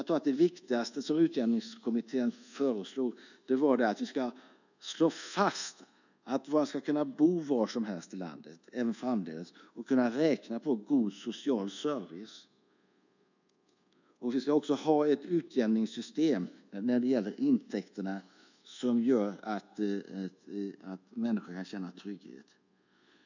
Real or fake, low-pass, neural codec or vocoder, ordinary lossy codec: fake; 7.2 kHz; codec, 24 kHz, 1.2 kbps, DualCodec; none